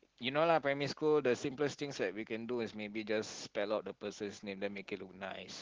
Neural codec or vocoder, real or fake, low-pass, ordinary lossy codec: none; real; 7.2 kHz; Opus, 16 kbps